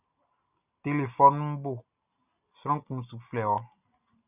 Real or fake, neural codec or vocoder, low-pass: real; none; 3.6 kHz